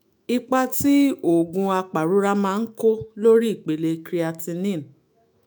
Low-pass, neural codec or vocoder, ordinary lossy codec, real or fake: none; autoencoder, 48 kHz, 128 numbers a frame, DAC-VAE, trained on Japanese speech; none; fake